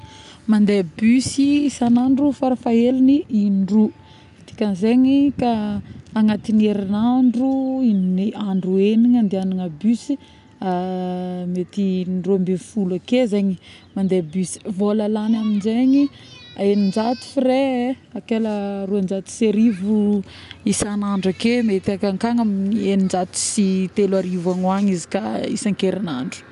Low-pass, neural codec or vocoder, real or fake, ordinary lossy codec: 10.8 kHz; none; real; none